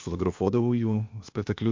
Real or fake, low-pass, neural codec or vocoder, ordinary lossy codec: fake; 7.2 kHz; codec, 16 kHz, 0.8 kbps, ZipCodec; MP3, 48 kbps